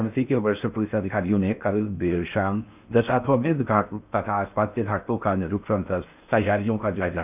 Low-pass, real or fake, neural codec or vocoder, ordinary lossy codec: 3.6 kHz; fake; codec, 16 kHz in and 24 kHz out, 0.6 kbps, FocalCodec, streaming, 4096 codes; none